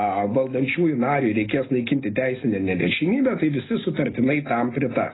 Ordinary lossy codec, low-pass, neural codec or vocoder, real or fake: AAC, 16 kbps; 7.2 kHz; none; real